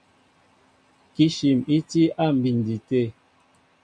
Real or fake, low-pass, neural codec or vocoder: real; 9.9 kHz; none